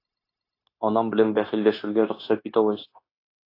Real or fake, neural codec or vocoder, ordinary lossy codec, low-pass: fake; codec, 16 kHz, 0.9 kbps, LongCat-Audio-Codec; AAC, 32 kbps; 5.4 kHz